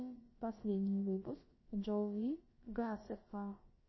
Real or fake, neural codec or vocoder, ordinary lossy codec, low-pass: fake; codec, 16 kHz, about 1 kbps, DyCAST, with the encoder's durations; MP3, 24 kbps; 7.2 kHz